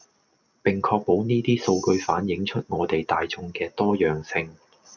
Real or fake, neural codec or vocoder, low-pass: real; none; 7.2 kHz